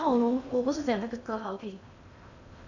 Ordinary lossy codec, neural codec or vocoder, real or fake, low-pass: none; codec, 16 kHz in and 24 kHz out, 0.8 kbps, FocalCodec, streaming, 65536 codes; fake; 7.2 kHz